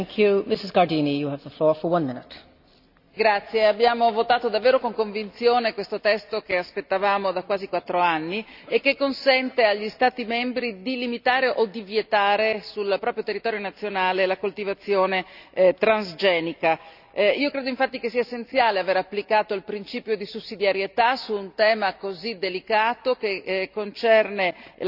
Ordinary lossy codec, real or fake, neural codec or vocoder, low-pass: none; real; none; 5.4 kHz